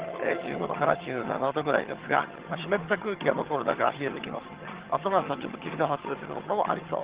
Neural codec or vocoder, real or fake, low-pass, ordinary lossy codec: vocoder, 22.05 kHz, 80 mel bands, HiFi-GAN; fake; 3.6 kHz; Opus, 16 kbps